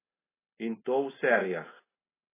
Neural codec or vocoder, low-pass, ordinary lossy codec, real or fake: none; 3.6 kHz; AAC, 16 kbps; real